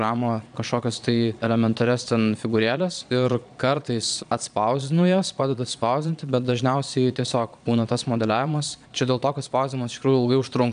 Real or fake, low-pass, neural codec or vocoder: fake; 9.9 kHz; vocoder, 22.05 kHz, 80 mel bands, Vocos